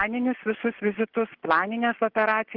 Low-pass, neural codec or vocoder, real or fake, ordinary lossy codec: 5.4 kHz; none; real; Opus, 16 kbps